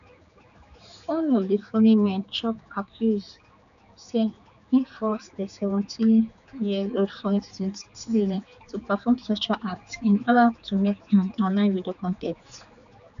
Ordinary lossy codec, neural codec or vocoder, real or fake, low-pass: none; codec, 16 kHz, 4 kbps, X-Codec, HuBERT features, trained on general audio; fake; 7.2 kHz